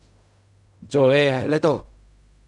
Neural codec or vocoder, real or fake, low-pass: codec, 16 kHz in and 24 kHz out, 0.4 kbps, LongCat-Audio-Codec, fine tuned four codebook decoder; fake; 10.8 kHz